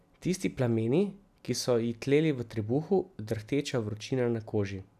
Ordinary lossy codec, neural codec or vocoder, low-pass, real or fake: none; none; 14.4 kHz; real